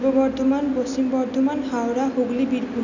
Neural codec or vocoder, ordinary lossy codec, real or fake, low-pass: none; none; real; 7.2 kHz